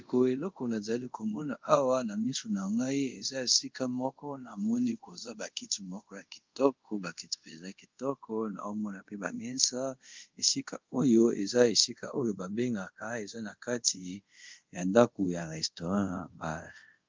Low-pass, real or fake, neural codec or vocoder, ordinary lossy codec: 7.2 kHz; fake; codec, 24 kHz, 0.5 kbps, DualCodec; Opus, 32 kbps